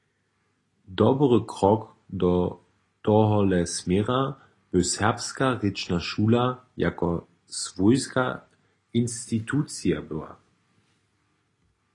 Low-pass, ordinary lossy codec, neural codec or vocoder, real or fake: 10.8 kHz; AAC, 32 kbps; none; real